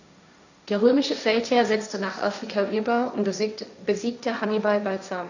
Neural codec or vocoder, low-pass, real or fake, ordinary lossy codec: codec, 16 kHz, 1.1 kbps, Voila-Tokenizer; 7.2 kHz; fake; none